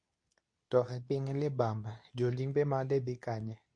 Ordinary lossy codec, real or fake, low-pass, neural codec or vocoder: MP3, 48 kbps; fake; 9.9 kHz; codec, 24 kHz, 0.9 kbps, WavTokenizer, medium speech release version 2